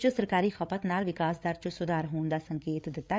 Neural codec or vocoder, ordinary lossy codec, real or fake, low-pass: codec, 16 kHz, 8 kbps, FreqCodec, larger model; none; fake; none